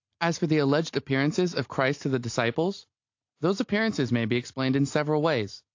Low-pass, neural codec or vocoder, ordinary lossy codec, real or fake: 7.2 kHz; none; AAC, 48 kbps; real